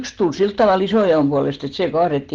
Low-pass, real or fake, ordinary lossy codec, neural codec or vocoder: 7.2 kHz; real; Opus, 16 kbps; none